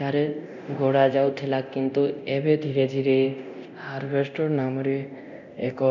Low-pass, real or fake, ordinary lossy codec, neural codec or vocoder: 7.2 kHz; fake; none; codec, 24 kHz, 0.9 kbps, DualCodec